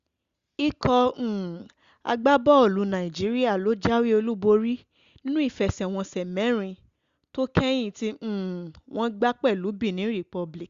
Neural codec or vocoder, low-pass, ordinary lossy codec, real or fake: none; 7.2 kHz; Opus, 64 kbps; real